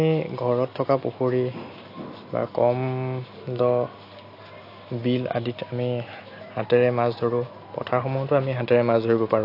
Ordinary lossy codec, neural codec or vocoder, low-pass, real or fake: AAC, 32 kbps; none; 5.4 kHz; real